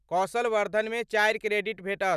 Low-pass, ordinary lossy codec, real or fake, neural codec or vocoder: 14.4 kHz; none; real; none